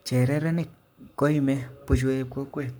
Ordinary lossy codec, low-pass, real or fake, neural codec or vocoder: none; none; fake; codec, 44.1 kHz, 7.8 kbps, Pupu-Codec